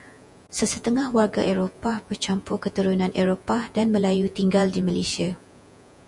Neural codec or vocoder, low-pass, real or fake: vocoder, 48 kHz, 128 mel bands, Vocos; 10.8 kHz; fake